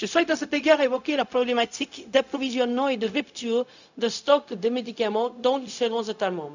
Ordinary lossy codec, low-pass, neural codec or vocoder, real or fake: none; 7.2 kHz; codec, 16 kHz, 0.4 kbps, LongCat-Audio-Codec; fake